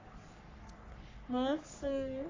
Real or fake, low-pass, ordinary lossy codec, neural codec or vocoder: fake; 7.2 kHz; AAC, 32 kbps; codec, 44.1 kHz, 3.4 kbps, Pupu-Codec